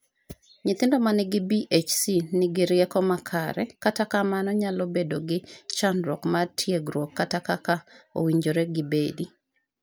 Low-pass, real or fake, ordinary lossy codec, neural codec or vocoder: none; real; none; none